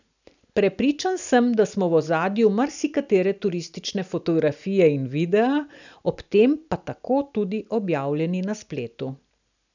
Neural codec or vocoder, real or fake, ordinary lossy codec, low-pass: none; real; none; 7.2 kHz